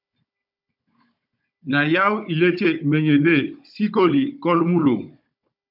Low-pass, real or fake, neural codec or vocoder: 5.4 kHz; fake; codec, 16 kHz, 16 kbps, FunCodec, trained on Chinese and English, 50 frames a second